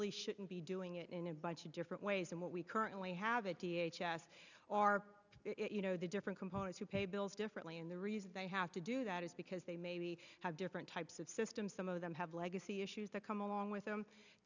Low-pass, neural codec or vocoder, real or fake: 7.2 kHz; none; real